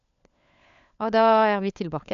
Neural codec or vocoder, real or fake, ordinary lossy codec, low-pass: codec, 16 kHz, 4 kbps, FunCodec, trained on LibriTTS, 50 frames a second; fake; none; 7.2 kHz